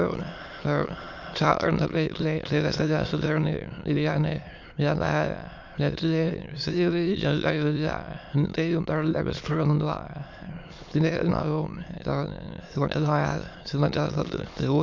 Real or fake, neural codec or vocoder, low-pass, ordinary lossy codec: fake; autoencoder, 22.05 kHz, a latent of 192 numbers a frame, VITS, trained on many speakers; 7.2 kHz; MP3, 64 kbps